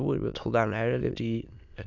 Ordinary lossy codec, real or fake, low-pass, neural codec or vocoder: none; fake; 7.2 kHz; autoencoder, 22.05 kHz, a latent of 192 numbers a frame, VITS, trained on many speakers